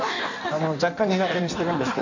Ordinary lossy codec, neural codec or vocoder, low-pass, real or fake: none; codec, 16 kHz in and 24 kHz out, 1.1 kbps, FireRedTTS-2 codec; 7.2 kHz; fake